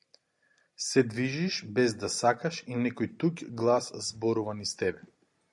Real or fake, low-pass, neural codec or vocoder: real; 10.8 kHz; none